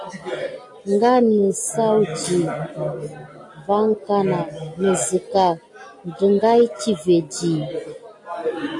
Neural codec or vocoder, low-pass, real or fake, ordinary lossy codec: none; 10.8 kHz; real; AAC, 48 kbps